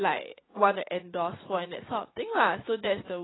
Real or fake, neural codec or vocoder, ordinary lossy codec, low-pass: fake; vocoder, 44.1 kHz, 80 mel bands, Vocos; AAC, 16 kbps; 7.2 kHz